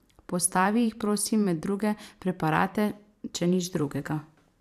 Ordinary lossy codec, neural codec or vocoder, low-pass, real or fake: none; vocoder, 44.1 kHz, 128 mel bands every 512 samples, BigVGAN v2; 14.4 kHz; fake